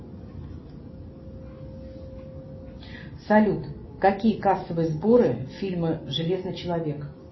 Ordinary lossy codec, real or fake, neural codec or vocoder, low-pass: MP3, 24 kbps; real; none; 7.2 kHz